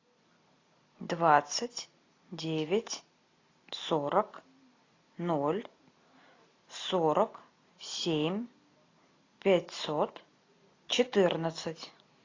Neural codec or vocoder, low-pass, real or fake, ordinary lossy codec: none; 7.2 kHz; real; AAC, 32 kbps